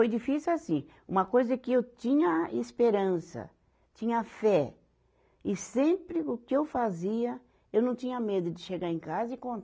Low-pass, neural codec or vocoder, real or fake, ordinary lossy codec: none; none; real; none